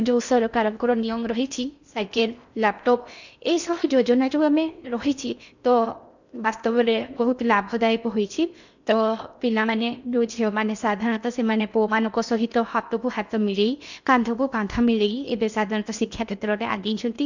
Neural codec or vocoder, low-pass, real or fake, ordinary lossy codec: codec, 16 kHz in and 24 kHz out, 0.6 kbps, FocalCodec, streaming, 4096 codes; 7.2 kHz; fake; none